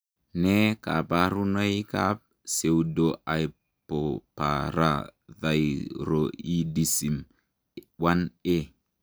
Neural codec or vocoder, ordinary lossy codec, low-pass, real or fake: none; none; none; real